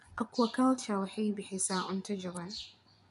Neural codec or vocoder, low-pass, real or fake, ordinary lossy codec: vocoder, 24 kHz, 100 mel bands, Vocos; 10.8 kHz; fake; none